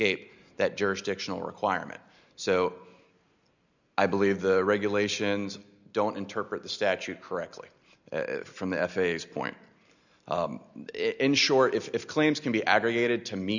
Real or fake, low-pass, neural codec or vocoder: real; 7.2 kHz; none